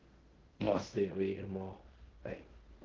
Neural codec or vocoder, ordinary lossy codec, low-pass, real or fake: codec, 16 kHz in and 24 kHz out, 0.9 kbps, LongCat-Audio-Codec, fine tuned four codebook decoder; Opus, 16 kbps; 7.2 kHz; fake